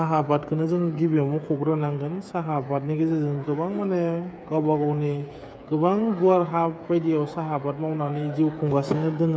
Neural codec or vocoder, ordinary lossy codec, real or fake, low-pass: codec, 16 kHz, 8 kbps, FreqCodec, smaller model; none; fake; none